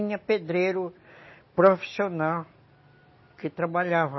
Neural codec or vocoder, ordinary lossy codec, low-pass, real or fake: none; MP3, 24 kbps; 7.2 kHz; real